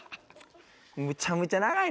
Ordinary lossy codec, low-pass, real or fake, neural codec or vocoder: none; none; real; none